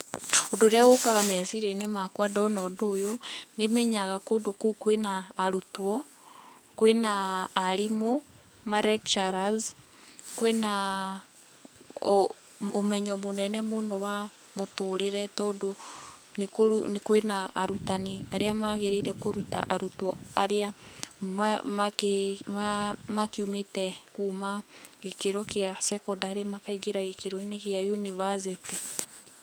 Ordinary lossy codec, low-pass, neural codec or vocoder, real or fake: none; none; codec, 44.1 kHz, 2.6 kbps, SNAC; fake